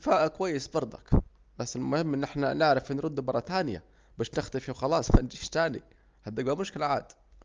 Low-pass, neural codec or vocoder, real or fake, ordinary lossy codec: 7.2 kHz; none; real; Opus, 24 kbps